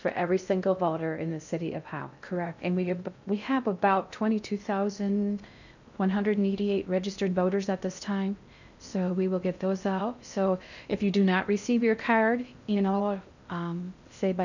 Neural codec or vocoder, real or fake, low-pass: codec, 16 kHz in and 24 kHz out, 0.6 kbps, FocalCodec, streaming, 2048 codes; fake; 7.2 kHz